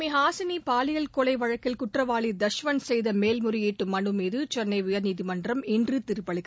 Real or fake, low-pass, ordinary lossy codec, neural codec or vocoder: real; none; none; none